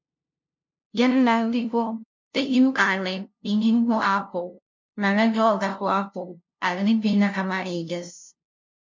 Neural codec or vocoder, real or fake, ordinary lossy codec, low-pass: codec, 16 kHz, 0.5 kbps, FunCodec, trained on LibriTTS, 25 frames a second; fake; MP3, 48 kbps; 7.2 kHz